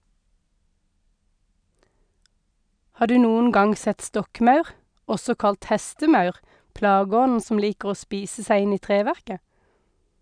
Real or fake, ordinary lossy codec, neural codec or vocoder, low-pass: real; none; none; 9.9 kHz